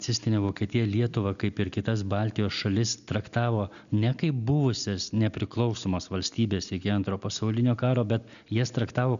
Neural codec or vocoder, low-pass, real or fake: none; 7.2 kHz; real